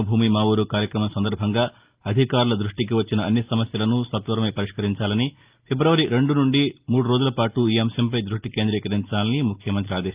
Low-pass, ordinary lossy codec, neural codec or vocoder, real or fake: 3.6 kHz; Opus, 24 kbps; none; real